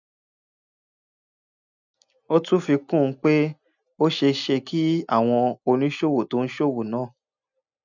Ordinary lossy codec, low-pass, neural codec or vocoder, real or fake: none; 7.2 kHz; none; real